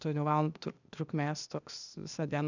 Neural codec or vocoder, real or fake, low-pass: codec, 16 kHz, 0.8 kbps, ZipCodec; fake; 7.2 kHz